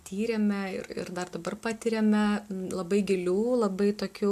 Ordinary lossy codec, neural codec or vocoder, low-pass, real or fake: MP3, 96 kbps; none; 14.4 kHz; real